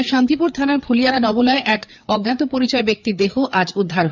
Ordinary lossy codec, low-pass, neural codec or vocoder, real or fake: none; 7.2 kHz; codec, 16 kHz, 4 kbps, FreqCodec, larger model; fake